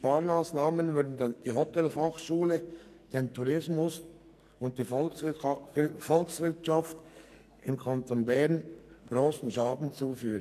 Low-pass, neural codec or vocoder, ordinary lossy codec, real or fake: 14.4 kHz; codec, 44.1 kHz, 2.6 kbps, SNAC; none; fake